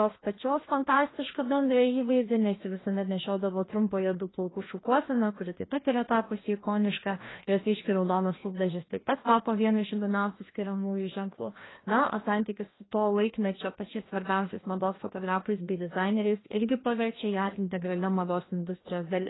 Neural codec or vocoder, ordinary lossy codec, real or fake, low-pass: codec, 16 kHz, 1 kbps, FreqCodec, larger model; AAC, 16 kbps; fake; 7.2 kHz